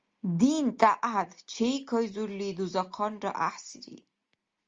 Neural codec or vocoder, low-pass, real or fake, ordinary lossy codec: none; 7.2 kHz; real; Opus, 32 kbps